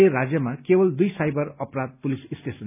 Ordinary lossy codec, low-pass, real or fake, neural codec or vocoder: none; 3.6 kHz; real; none